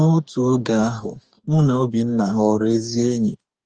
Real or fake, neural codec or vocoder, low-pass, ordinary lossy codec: fake; codec, 44.1 kHz, 2.6 kbps, DAC; 9.9 kHz; Opus, 24 kbps